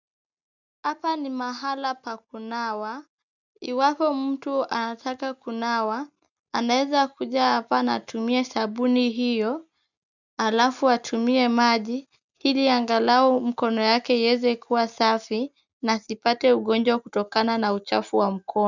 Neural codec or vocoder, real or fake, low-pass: none; real; 7.2 kHz